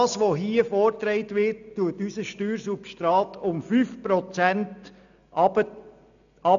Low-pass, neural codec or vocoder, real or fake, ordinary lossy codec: 7.2 kHz; none; real; none